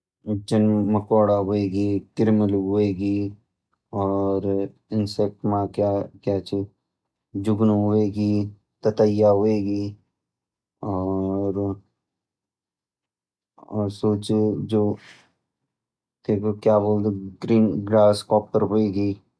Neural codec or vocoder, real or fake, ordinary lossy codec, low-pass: none; real; none; none